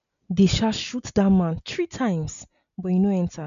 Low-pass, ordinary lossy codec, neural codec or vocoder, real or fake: 7.2 kHz; none; none; real